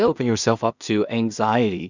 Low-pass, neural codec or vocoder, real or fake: 7.2 kHz; codec, 16 kHz in and 24 kHz out, 0.4 kbps, LongCat-Audio-Codec, two codebook decoder; fake